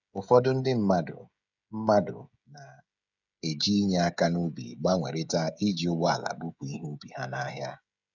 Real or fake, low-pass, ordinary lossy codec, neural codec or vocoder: fake; 7.2 kHz; none; codec, 16 kHz, 16 kbps, FreqCodec, smaller model